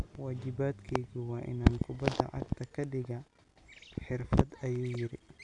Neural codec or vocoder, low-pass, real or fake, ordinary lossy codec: none; 10.8 kHz; real; none